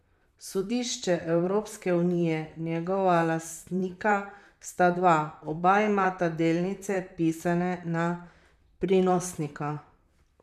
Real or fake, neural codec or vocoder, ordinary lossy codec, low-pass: fake; vocoder, 44.1 kHz, 128 mel bands, Pupu-Vocoder; none; 14.4 kHz